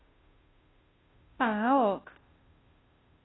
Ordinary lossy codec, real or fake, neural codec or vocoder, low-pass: AAC, 16 kbps; fake; codec, 16 kHz, 0.5 kbps, FunCodec, trained on Chinese and English, 25 frames a second; 7.2 kHz